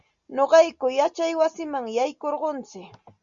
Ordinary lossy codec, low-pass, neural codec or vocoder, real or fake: Opus, 64 kbps; 7.2 kHz; none; real